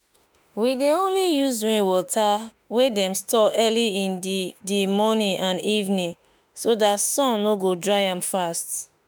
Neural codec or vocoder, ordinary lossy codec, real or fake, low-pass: autoencoder, 48 kHz, 32 numbers a frame, DAC-VAE, trained on Japanese speech; none; fake; none